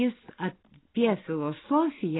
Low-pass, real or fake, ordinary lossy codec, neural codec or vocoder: 7.2 kHz; real; AAC, 16 kbps; none